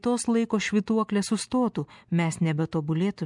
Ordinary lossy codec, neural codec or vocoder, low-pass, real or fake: MP3, 96 kbps; none; 10.8 kHz; real